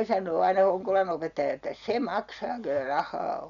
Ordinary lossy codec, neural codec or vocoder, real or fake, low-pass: none; none; real; 7.2 kHz